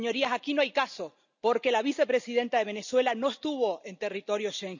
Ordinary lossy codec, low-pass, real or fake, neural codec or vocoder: none; 7.2 kHz; real; none